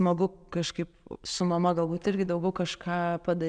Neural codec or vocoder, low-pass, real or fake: none; 9.9 kHz; real